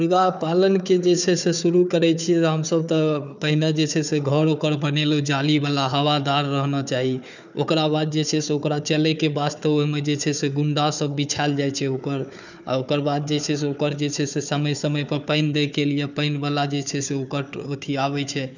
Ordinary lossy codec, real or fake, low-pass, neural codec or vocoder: none; fake; 7.2 kHz; codec, 16 kHz, 4 kbps, FunCodec, trained on Chinese and English, 50 frames a second